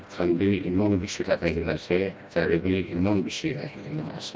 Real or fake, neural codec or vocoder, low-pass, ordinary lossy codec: fake; codec, 16 kHz, 1 kbps, FreqCodec, smaller model; none; none